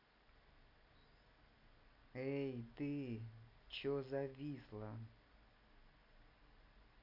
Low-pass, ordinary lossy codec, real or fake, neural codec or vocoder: 5.4 kHz; none; real; none